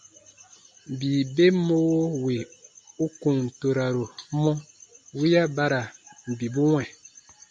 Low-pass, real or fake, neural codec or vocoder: 9.9 kHz; real; none